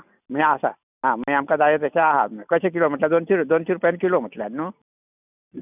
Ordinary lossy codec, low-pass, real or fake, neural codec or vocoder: none; 3.6 kHz; real; none